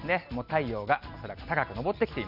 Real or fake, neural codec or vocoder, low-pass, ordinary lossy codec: real; none; 5.4 kHz; none